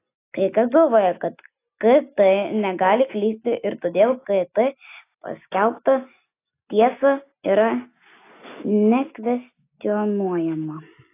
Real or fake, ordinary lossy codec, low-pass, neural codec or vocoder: real; AAC, 24 kbps; 3.6 kHz; none